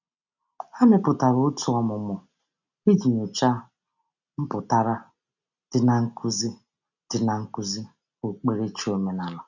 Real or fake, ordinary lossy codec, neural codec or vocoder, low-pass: real; none; none; 7.2 kHz